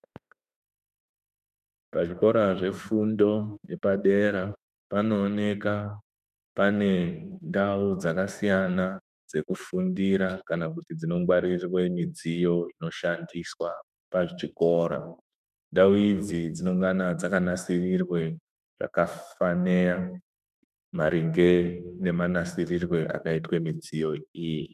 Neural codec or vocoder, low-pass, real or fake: autoencoder, 48 kHz, 32 numbers a frame, DAC-VAE, trained on Japanese speech; 14.4 kHz; fake